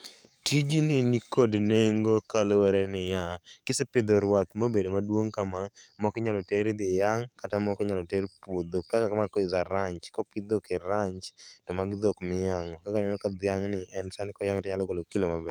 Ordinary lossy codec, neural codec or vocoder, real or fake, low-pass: none; codec, 44.1 kHz, 7.8 kbps, DAC; fake; 19.8 kHz